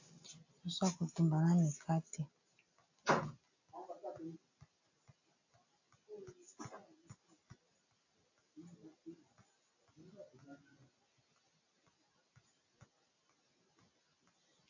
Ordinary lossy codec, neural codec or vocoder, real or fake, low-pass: AAC, 48 kbps; none; real; 7.2 kHz